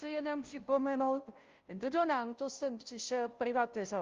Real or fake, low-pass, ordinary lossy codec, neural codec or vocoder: fake; 7.2 kHz; Opus, 32 kbps; codec, 16 kHz, 0.5 kbps, FunCodec, trained on Chinese and English, 25 frames a second